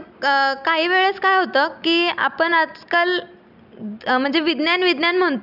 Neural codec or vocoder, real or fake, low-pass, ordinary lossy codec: none; real; 5.4 kHz; none